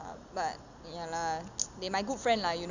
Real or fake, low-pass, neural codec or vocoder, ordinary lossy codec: real; 7.2 kHz; none; none